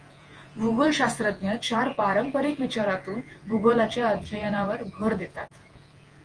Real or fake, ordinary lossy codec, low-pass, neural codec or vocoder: fake; Opus, 24 kbps; 9.9 kHz; vocoder, 48 kHz, 128 mel bands, Vocos